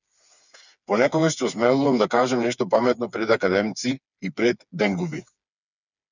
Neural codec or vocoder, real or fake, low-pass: codec, 16 kHz, 4 kbps, FreqCodec, smaller model; fake; 7.2 kHz